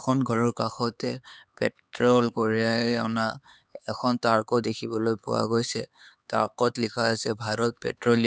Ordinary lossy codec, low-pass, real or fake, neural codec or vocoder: none; none; fake; codec, 16 kHz, 4 kbps, X-Codec, HuBERT features, trained on LibriSpeech